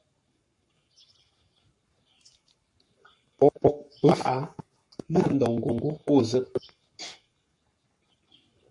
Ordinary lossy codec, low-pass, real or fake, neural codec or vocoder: MP3, 48 kbps; 10.8 kHz; fake; codec, 44.1 kHz, 7.8 kbps, Pupu-Codec